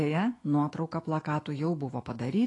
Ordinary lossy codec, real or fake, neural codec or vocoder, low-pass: AAC, 32 kbps; fake; autoencoder, 48 kHz, 128 numbers a frame, DAC-VAE, trained on Japanese speech; 10.8 kHz